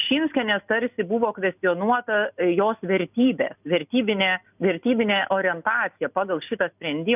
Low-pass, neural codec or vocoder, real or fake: 3.6 kHz; none; real